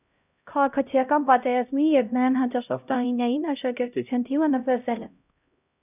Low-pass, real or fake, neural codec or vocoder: 3.6 kHz; fake; codec, 16 kHz, 0.5 kbps, X-Codec, HuBERT features, trained on LibriSpeech